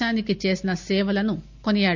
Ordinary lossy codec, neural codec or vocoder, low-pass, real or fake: none; none; 7.2 kHz; real